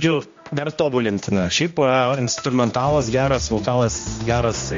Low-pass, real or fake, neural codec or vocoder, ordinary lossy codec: 7.2 kHz; fake; codec, 16 kHz, 1 kbps, X-Codec, HuBERT features, trained on general audio; MP3, 48 kbps